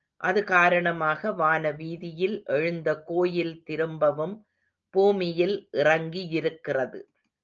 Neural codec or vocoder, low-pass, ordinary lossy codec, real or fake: none; 7.2 kHz; Opus, 24 kbps; real